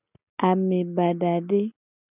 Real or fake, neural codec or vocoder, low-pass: real; none; 3.6 kHz